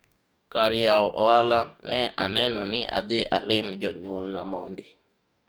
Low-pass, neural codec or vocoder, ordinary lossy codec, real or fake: none; codec, 44.1 kHz, 2.6 kbps, DAC; none; fake